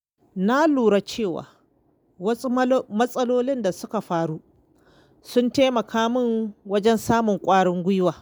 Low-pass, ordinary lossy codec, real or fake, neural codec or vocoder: none; none; real; none